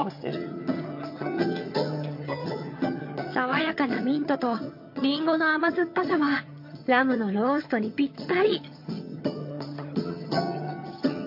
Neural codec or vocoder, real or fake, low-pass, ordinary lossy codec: vocoder, 22.05 kHz, 80 mel bands, HiFi-GAN; fake; 5.4 kHz; MP3, 32 kbps